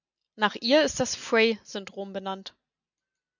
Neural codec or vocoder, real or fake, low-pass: none; real; 7.2 kHz